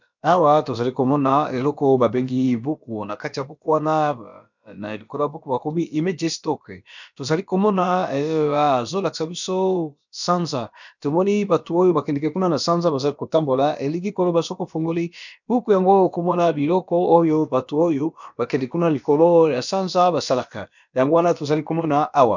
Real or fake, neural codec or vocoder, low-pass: fake; codec, 16 kHz, about 1 kbps, DyCAST, with the encoder's durations; 7.2 kHz